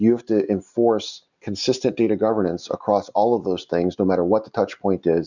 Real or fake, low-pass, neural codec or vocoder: real; 7.2 kHz; none